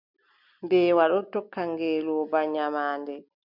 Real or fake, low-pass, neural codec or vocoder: real; 5.4 kHz; none